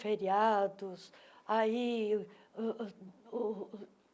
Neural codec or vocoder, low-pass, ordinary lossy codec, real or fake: none; none; none; real